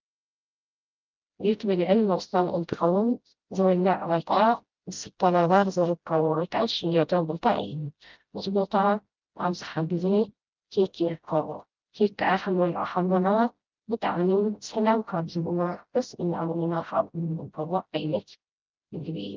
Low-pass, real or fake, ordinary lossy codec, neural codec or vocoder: 7.2 kHz; fake; Opus, 24 kbps; codec, 16 kHz, 0.5 kbps, FreqCodec, smaller model